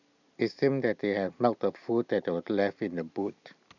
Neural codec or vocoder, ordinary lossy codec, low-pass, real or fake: none; none; 7.2 kHz; real